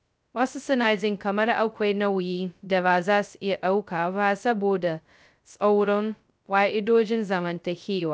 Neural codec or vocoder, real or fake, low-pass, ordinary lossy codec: codec, 16 kHz, 0.2 kbps, FocalCodec; fake; none; none